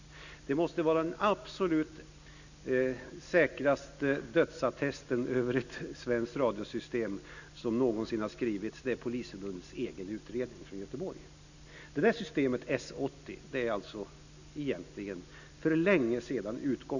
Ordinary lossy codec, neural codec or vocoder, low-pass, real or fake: none; none; 7.2 kHz; real